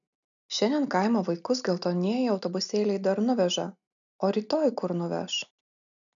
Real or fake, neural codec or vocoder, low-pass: real; none; 7.2 kHz